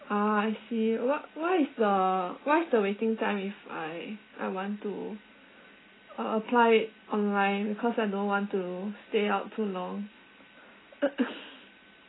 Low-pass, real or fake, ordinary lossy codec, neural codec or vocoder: 7.2 kHz; real; AAC, 16 kbps; none